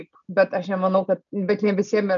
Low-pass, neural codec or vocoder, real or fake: 7.2 kHz; none; real